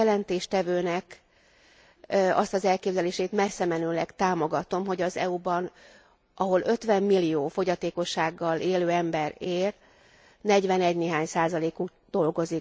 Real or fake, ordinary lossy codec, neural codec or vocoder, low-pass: real; none; none; none